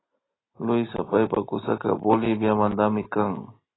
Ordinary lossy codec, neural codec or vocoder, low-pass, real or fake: AAC, 16 kbps; none; 7.2 kHz; real